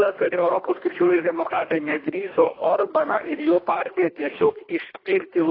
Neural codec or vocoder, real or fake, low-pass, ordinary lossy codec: codec, 24 kHz, 1.5 kbps, HILCodec; fake; 5.4 kHz; AAC, 24 kbps